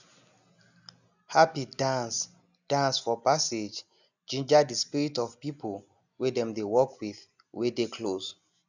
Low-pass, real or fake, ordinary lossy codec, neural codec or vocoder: 7.2 kHz; real; none; none